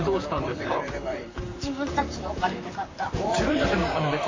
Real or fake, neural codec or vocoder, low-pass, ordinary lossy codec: fake; vocoder, 44.1 kHz, 128 mel bands, Pupu-Vocoder; 7.2 kHz; MP3, 48 kbps